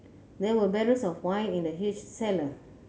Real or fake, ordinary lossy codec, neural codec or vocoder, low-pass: real; none; none; none